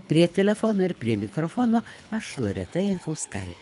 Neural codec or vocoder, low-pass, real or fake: codec, 24 kHz, 3 kbps, HILCodec; 10.8 kHz; fake